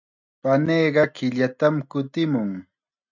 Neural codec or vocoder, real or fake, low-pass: none; real; 7.2 kHz